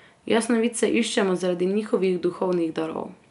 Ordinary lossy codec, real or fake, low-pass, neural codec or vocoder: none; real; 10.8 kHz; none